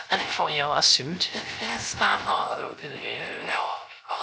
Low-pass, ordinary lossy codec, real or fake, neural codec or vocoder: none; none; fake; codec, 16 kHz, 0.3 kbps, FocalCodec